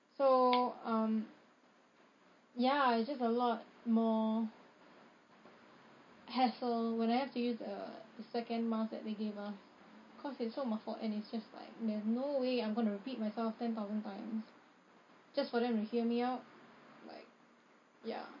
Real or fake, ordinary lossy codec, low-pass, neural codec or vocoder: real; MP3, 32 kbps; 7.2 kHz; none